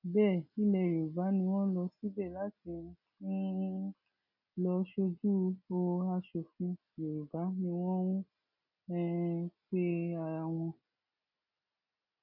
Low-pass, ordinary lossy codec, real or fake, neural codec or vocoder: 5.4 kHz; none; real; none